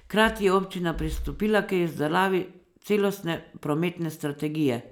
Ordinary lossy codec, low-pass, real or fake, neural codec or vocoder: none; 19.8 kHz; real; none